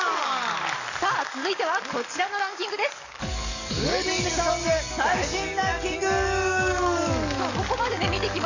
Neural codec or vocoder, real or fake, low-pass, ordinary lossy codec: codec, 44.1 kHz, 7.8 kbps, DAC; fake; 7.2 kHz; none